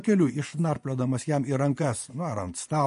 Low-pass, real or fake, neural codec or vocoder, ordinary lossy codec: 10.8 kHz; fake; vocoder, 24 kHz, 100 mel bands, Vocos; MP3, 48 kbps